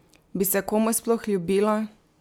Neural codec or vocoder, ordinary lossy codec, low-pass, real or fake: none; none; none; real